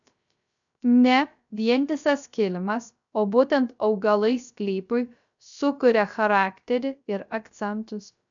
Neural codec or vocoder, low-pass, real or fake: codec, 16 kHz, 0.3 kbps, FocalCodec; 7.2 kHz; fake